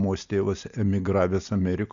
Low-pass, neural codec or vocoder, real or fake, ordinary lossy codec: 7.2 kHz; none; real; MP3, 96 kbps